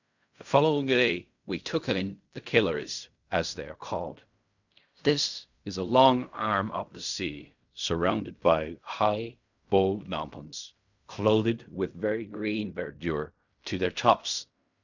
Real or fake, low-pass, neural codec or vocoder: fake; 7.2 kHz; codec, 16 kHz in and 24 kHz out, 0.4 kbps, LongCat-Audio-Codec, fine tuned four codebook decoder